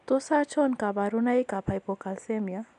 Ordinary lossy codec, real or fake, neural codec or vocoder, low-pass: none; real; none; 10.8 kHz